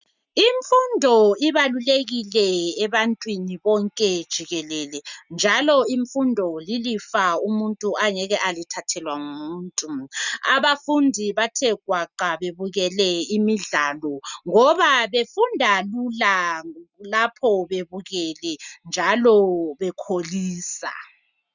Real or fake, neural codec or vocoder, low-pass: real; none; 7.2 kHz